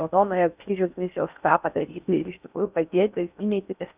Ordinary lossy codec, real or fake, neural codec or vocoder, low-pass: Opus, 64 kbps; fake; codec, 16 kHz in and 24 kHz out, 0.6 kbps, FocalCodec, streaming, 4096 codes; 3.6 kHz